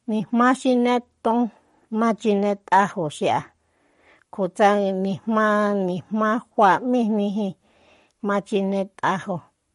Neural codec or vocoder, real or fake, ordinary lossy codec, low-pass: codec, 44.1 kHz, 7.8 kbps, Pupu-Codec; fake; MP3, 48 kbps; 19.8 kHz